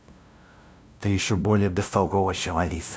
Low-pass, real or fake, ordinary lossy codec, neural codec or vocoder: none; fake; none; codec, 16 kHz, 0.5 kbps, FunCodec, trained on LibriTTS, 25 frames a second